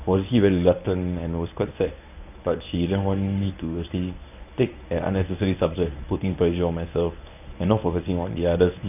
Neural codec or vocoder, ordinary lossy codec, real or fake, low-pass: codec, 24 kHz, 0.9 kbps, WavTokenizer, medium speech release version 1; none; fake; 3.6 kHz